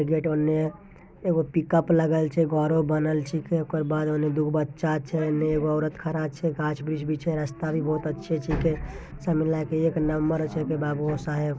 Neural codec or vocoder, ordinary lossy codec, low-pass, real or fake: none; none; none; real